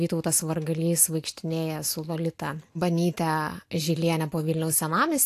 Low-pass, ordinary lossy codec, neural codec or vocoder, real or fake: 14.4 kHz; AAC, 64 kbps; none; real